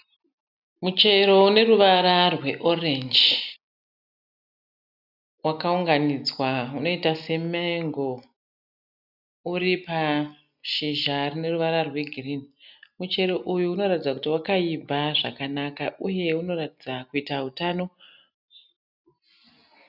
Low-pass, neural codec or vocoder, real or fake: 5.4 kHz; none; real